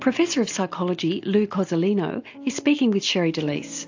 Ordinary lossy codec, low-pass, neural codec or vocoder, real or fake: AAC, 48 kbps; 7.2 kHz; none; real